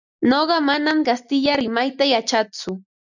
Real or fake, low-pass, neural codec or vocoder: real; 7.2 kHz; none